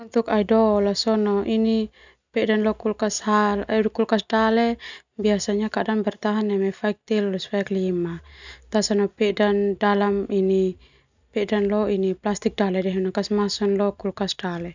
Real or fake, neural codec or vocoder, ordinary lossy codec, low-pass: real; none; none; 7.2 kHz